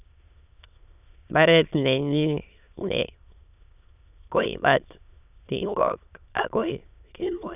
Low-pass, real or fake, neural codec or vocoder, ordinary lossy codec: 3.6 kHz; fake; autoencoder, 22.05 kHz, a latent of 192 numbers a frame, VITS, trained on many speakers; none